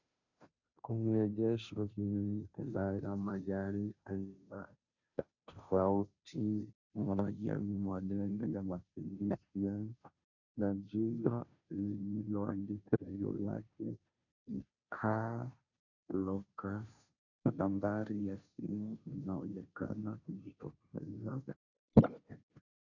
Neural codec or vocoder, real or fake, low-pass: codec, 16 kHz, 0.5 kbps, FunCodec, trained on Chinese and English, 25 frames a second; fake; 7.2 kHz